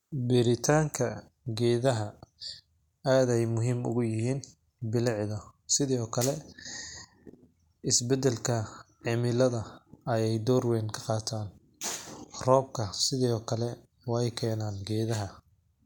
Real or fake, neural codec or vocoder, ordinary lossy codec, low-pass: real; none; none; 19.8 kHz